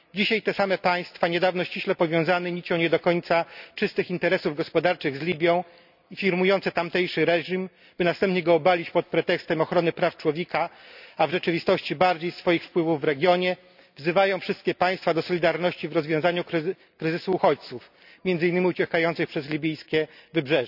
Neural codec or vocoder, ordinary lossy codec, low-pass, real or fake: none; none; 5.4 kHz; real